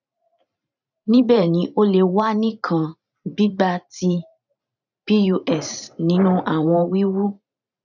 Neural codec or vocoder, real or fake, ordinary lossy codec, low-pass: vocoder, 44.1 kHz, 128 mel bands every 512 samples, BigVGAN v2; fake; none; 7.2 kHz